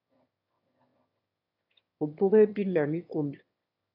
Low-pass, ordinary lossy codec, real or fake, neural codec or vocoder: 5.4 kHz; AAC, 48 kbps; fake; autoencoder, 22.05 kHz, a latent of 192 numbers a frame, VITS, trained on one speaker